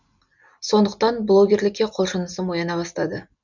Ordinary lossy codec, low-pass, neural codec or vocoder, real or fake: none; 7.2 kHz; none; real